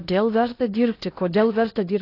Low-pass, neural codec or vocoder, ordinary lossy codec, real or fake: 5.4 kHz; codec, 16 kHz in and 24 kHz out, 0.6 kbps, FocalCodec, streaming, 2048 codes; AAC, 24 kbps; fake